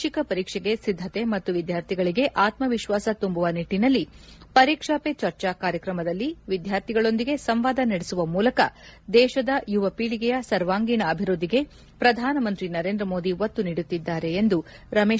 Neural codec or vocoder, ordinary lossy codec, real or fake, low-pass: none; none; real; none